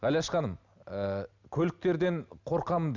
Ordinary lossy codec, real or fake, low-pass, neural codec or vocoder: none; real; 7.2 kHz; none